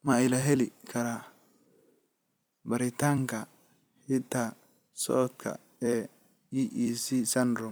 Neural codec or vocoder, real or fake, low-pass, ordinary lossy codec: vocoder, 44.1 kHz, 128 mel bands every 256 samples, BigVGAN v2; fake; none; none